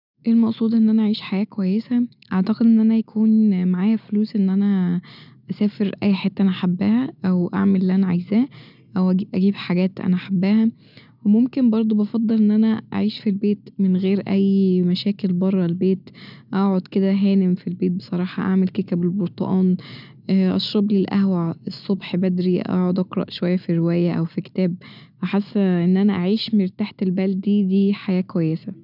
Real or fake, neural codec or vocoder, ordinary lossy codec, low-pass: real; none; none; 5.4 kHz